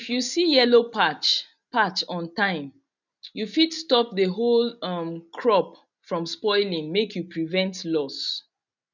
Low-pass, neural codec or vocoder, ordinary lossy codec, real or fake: 7.2 kHz; none; none; real